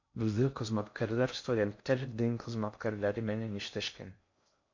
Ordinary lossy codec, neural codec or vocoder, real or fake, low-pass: MP3, 48 kbps; codec, 16 kHz in and 24 kHz out, 0.6 kbps, FocalCodec, streaming, 2048 codes; fake; 7.2 kHz